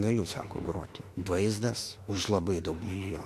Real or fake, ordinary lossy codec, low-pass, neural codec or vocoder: fake; AAC, 64 kbps; 14.4 kHz; autoencoder, 48 kHz, 32 numbers a frame, DAC-VAE, trained on Japanese speech